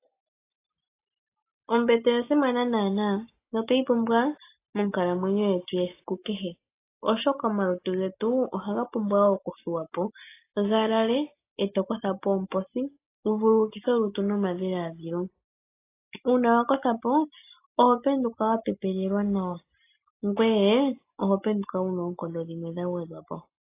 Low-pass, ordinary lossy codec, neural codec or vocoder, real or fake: 3.6 kHz; AAC, 24 kbps; none; real